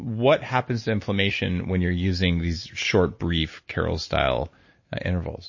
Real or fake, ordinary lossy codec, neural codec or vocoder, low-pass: real; MP3, 32 kbps; none; 7.2 kHz